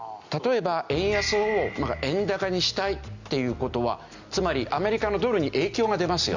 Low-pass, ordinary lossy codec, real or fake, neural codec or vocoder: 7.2 kHz; Opus, 64 kbps; real; none